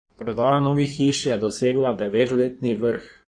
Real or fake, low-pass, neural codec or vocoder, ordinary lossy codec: fake; 9.9 kHz; codec, 16 kHz in and 24 kHz out, 1.1 kbps, FireRedTTS-2 codec; none